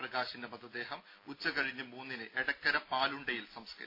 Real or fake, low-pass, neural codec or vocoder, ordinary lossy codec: real; 5.4 kHz; none; MP3, 24 kbps